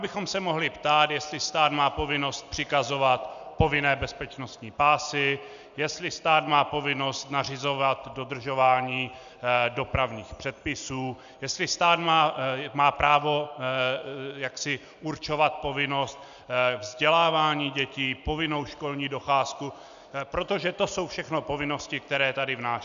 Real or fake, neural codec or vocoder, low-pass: real; none; 7.2 kHz